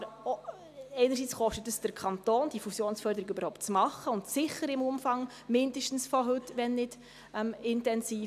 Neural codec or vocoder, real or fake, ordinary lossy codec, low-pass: none; real; none; 14.4 kHz